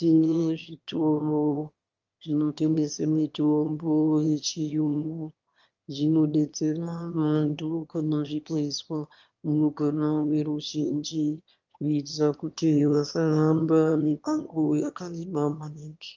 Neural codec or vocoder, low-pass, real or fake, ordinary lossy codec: autoencoder, 22.05 kHz, a latent of 192 numbers a frame, VITS, trained on one speaker; 7.2 kHz; fake; Opus, 24 kbps